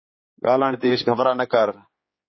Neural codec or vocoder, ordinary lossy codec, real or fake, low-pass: codec, 16 kHz, 4 kbps, X-Codec, HuBERT features, trained on general audio; MP3, 24 kbps; fake; 7.2 kHz